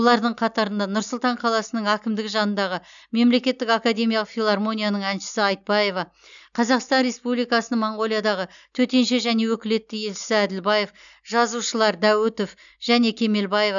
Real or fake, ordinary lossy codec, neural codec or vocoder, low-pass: real; none; none; 7.2 kHz